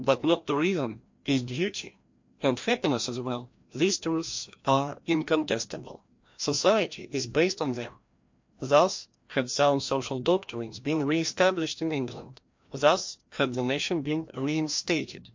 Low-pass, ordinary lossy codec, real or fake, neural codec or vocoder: 7.2 kHz; MP3, 48 kbps; fake; codec, 16 kHz, 1 kbps, FreqCodec, larger model